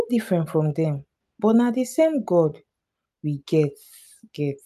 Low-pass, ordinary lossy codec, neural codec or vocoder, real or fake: 14.4 kHz; none; none; real